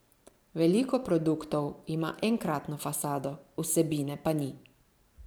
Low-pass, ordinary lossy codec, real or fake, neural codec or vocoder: none; none; fake; vocoder, 44.1 kHz, 128 mel bands every 512 samples, BigVGAN v2